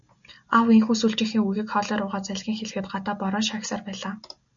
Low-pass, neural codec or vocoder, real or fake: 7.2 kHz; none; real